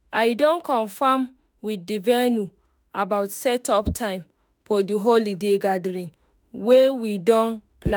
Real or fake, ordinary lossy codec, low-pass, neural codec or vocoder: fake; none; none; autoencoder, 48 kHz, 32 numbers a frame, DAC-VAE, trained on Japanese speech